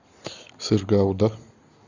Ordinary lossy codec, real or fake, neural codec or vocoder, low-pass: Opus, 64 kbps; real; none; 7.2 kHz